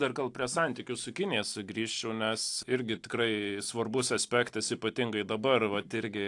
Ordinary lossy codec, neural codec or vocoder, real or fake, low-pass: AAC, 64 kbps; none; real; 10.8 kHz